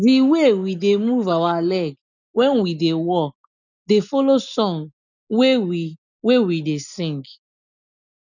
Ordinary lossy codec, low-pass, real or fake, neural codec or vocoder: none; 7.2 kHz; real; none